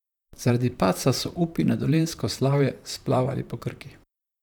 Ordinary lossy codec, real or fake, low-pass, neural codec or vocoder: none; fake; 19.8 kHz; vocoder, 44.1 kHz, 128 mel bands, Pupu-Vocoder